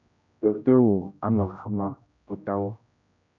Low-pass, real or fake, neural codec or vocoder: 7.2 kHz; fake; codec, 16 kHz, 0.5 kbps, X-Codec, HuBERT features, trained on general audio